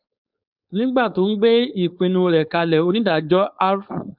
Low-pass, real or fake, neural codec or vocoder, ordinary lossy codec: 5.4 kHz; fake; codec, 16 kHz, 4.8 kbps, FACodec; none